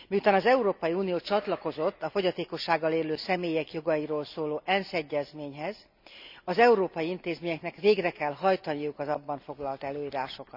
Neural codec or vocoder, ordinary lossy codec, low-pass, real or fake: none; none; 5.4 kHz; real